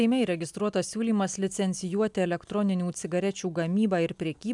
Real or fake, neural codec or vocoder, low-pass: real; none; 10.8 kHz